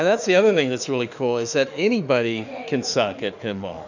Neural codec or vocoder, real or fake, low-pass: autoencoder, 48 kHz, 32 numbers a frame, DAC-VAE, trained on Japanese speech; fake; 7.2 kHz